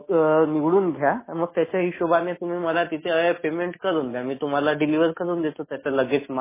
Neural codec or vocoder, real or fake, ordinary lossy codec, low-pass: none; real; MP3, 16 kbps; 3.6 kHz